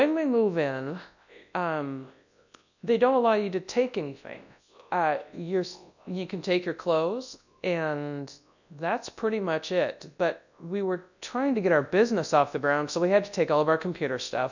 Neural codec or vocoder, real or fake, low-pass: codec, 24 kHz, 0.9 kbps, WavTokenizer, large speech release; fake; 7.2 kHz